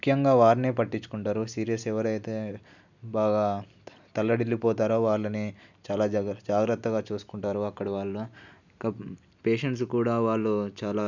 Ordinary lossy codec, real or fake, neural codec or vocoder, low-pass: none; real; none; 7.2 kHz